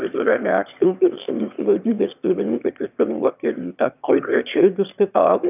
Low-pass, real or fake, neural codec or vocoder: 3.6 kHz; fake; autoencoder, 22.05 kHz, a latent of 192 numbers a frame, VITS, trained on one speaker